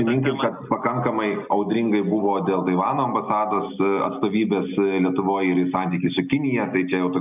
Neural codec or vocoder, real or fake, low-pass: none; real; 3.6 kHz